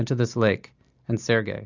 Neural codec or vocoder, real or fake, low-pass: none; real; 7.2 kHz